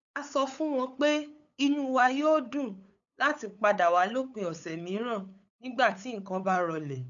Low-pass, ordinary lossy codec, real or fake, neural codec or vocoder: 7.2 kHz; none; fake; codec, 16 kHz, 8 kbps, FunCodec, trained on LibriTTS, 25 frames a second